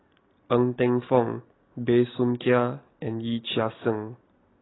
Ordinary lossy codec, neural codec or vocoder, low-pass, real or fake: AAC, 16 kbps; none; 7.2 kHz; real